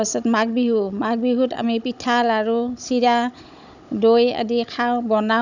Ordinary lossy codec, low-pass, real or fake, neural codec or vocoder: none; 7.2 kHz; fake; codec, 16 kHz, 16 kbps, FunCodec, trained on Chinese and English, 50 frames a second